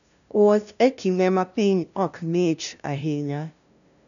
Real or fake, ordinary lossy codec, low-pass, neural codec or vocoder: fake; none; 7.2 kHz; codec, 16 kHz, 0.5 kbps, FunCodec, trained on LibriTTS, 25 frames a second